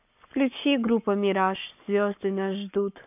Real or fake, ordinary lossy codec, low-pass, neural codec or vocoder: fake; none; 3.6 kHz; codec, 44.1 kHz, 7.8 kbps, Pupu-Codec